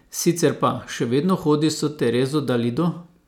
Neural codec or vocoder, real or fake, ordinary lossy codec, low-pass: none; real; none; 19.8 kHz